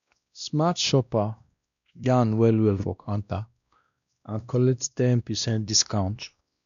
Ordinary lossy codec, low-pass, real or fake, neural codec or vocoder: none; 7.2 kHz; fake; codec, 16 kHz, 1 kbps, X-Codec, WavLM features, trained on Multilingual LibriSpeech